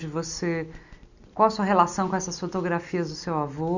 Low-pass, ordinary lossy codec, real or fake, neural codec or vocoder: 7.2 kHz; none; real; none